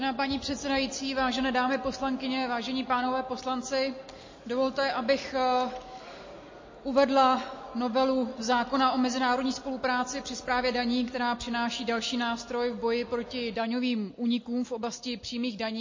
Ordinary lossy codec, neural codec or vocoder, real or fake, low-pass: MP3, 32 kbps; none; real; 7.2 kHz